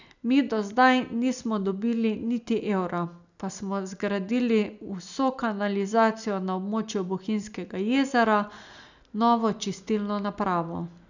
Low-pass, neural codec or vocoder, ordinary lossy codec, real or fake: 7.2 kHz; none; none; real